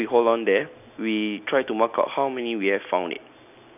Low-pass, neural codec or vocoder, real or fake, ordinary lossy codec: 3.6 kHz; none; real; none